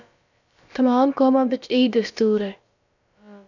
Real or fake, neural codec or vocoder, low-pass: fake; codec, 16 kHz, about 1 kbps, DyCAST, with the encoder's durations; 7.2 kHz